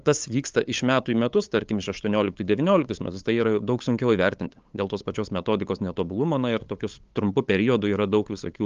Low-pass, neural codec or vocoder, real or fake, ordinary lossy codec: 7.2 kHz; codec, 16 kHz, 8 kbps, FunCodec, trained on LibriTTS, 25 frames a second; fake; Opus, 32 kbps